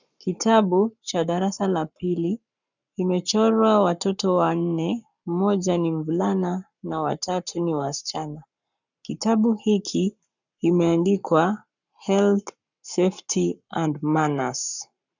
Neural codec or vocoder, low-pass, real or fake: codec, 44.1 kHz, 7.8 kbps, Pupu-Codec; 7.2 kHz; fake